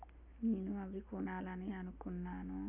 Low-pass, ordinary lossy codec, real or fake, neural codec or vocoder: 3.6 kHz; none; real; none